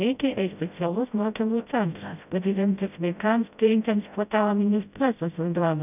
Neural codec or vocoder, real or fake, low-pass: codec, 16 kHz, 0.5 kbps, FreqCodec, smaller model; fake; 3.6 kHz